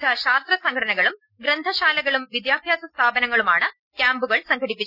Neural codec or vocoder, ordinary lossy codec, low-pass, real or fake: none; none; 5.4 kHz; real